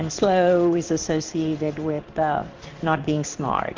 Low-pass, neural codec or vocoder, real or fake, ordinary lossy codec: 7.2 kHz; codec, 16 kHz in and 24 kHz out, 1 kbps, XY-Tokenizer; fake; Opus, 32 kbps